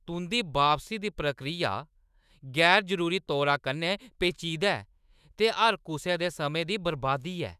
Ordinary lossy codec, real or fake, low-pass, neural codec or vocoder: none; fake; 14.4 kHz; autoencoder, 48 kHz, 128 numbers a frame, DAC-VAE, trained on Japanese speech